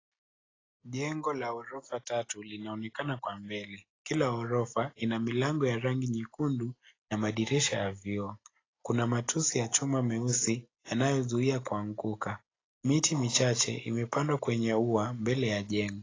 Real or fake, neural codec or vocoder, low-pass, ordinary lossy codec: real; none; 7.2 kHz; AAC, 32 kbps